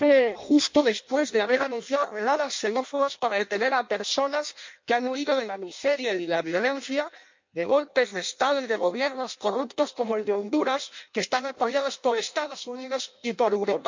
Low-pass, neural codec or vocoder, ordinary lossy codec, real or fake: 7.2 kHz; codec, 16 kHz in and 24 kHz out, 0.6 kbps, FireRedTTS-2 codec; MP3, 48 kbps; fake